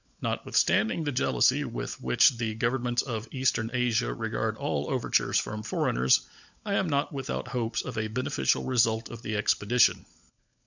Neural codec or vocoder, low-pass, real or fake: codec, 16 kHz, 16 kbps, FunCodec, trained on LibriTTS, 50 frames a second; 7.2 kHz; fake